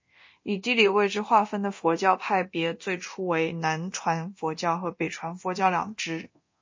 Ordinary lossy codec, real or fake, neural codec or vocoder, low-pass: MP3, 32 kbps; fake; codec, 24 kHz, 0.9 kbps, DualCodec; 7.2 kHz